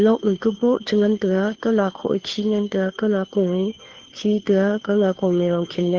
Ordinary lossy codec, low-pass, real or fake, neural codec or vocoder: Opus, 16 kbps; 7.2 kHz; fake; codec, 16 kHz, 2 kbps, FunCodec, trained on Chinese and English, 25 frames a second